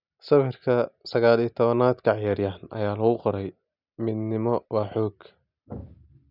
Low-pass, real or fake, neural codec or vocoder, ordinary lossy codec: 5.4 kHz; real; none; none